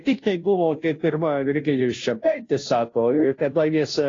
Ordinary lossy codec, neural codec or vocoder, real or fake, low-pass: AAC, 32 kbps; codec, 16 kHz, 0.5 kbps, FunCodec, trained on Chinese and English, 25 frames a second; fake; 7.2 kHz